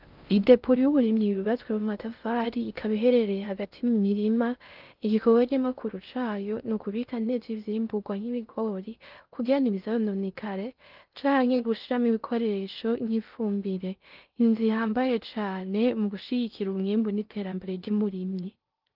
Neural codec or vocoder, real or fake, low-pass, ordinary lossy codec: codec, 16 kHz in and 24 kHz out, 0.6 kbps, FocalCodec, streaming, 4096 codes; fake; 5.4 kHz; Opus, 32 kbps